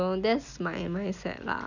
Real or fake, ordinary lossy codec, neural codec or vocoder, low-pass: real; none; none; 7.2 kHz